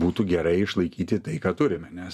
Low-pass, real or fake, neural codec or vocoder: 14.4 kHz; real; none